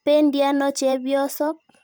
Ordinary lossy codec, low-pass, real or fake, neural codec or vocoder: none; none; fake; vocoder, 44.1 kHz, 128 mel bands every 512 samples, BigVGAN v2